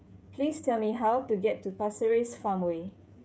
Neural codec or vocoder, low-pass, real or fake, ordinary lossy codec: codec, 16 kHz, 16 kbps, FreqCodec, smaller model; none; fake; none